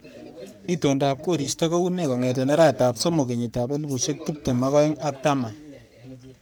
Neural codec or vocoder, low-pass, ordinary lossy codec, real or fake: codec, 44.1 kHz, 3.4 kbps, Pupu-Codec; none; none; fake